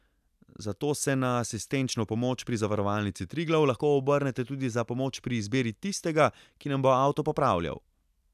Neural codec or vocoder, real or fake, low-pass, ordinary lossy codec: none; real; 14.4 kHz; none